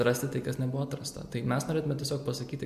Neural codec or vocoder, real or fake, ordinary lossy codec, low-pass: none; real; MP3, 64 kbps; 14.4 kHz